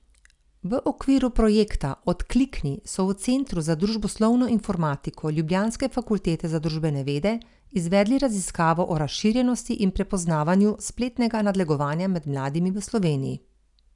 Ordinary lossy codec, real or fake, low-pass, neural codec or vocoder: none; real; 10.8 kHz; none